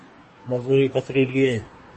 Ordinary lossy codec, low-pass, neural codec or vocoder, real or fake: MP3, 32 kbps; 10.8 kHz; codec, 24 kHz, 1 kbps, SNAC; fake